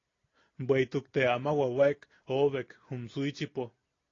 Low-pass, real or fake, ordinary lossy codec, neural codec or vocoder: 7.2 kHz; real; AAC, 32 kbps; none